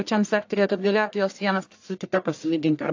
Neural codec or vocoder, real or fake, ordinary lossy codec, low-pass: codec, 44.1 kHz, 1.7 kbps, Pupu-Codec; fake; AAC, 48 kbps; 7.2 kHz